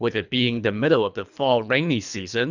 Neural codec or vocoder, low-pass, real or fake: codec, 24 kHz, 3 kbps, HILCodec; 7.2 kHz; fake